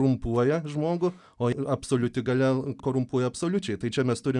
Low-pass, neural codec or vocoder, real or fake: 10.8 kHz; none; real